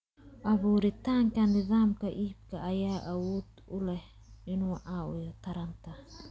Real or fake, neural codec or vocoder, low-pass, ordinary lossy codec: real; none; none; none